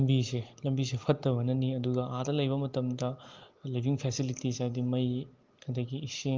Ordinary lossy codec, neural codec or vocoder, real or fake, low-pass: Opus, 32 kbps; none; real; 7.2 kHz